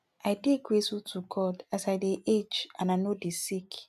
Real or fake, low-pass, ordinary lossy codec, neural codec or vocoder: real; 14.4 kHz; none; none